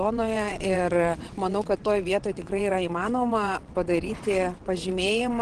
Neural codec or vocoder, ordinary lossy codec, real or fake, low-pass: vocoder, 22.05 kHz, 80 mel bands, WaveNeXt; Opus, 16 kbps; fake; 9.9 kHz